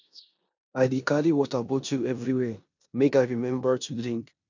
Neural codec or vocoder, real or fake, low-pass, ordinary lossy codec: codec, 16 kHz in and 24 kHz out, 0.9 kbps, LongCat-Audio-Codec, four codebook decoder; fake; 7.2 kHz; none